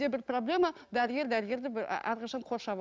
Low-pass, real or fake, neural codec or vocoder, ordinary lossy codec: none; real; none; none